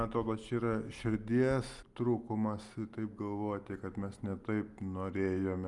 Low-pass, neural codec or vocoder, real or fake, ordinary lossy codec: 10.8 kHz; none; real; Opus, 32 kbps